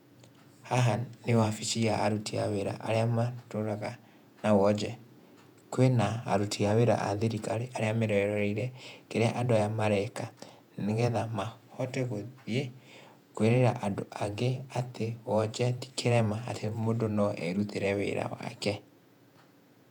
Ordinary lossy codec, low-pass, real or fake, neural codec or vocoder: none; 19.8 kHz; fake; vocoder, 48 kHz, 128 mel bands, Vocos